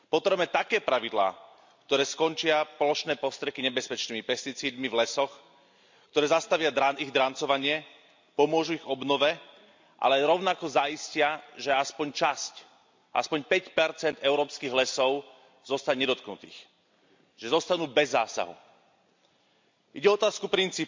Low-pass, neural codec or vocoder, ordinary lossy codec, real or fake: 7.2 kHz; none; MP3, 64 kbps; real